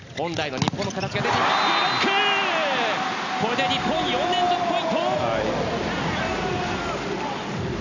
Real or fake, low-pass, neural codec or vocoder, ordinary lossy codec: real; 7.2 kHz; none; none